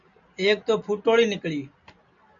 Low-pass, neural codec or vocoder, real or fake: 7.2 kHz; none; real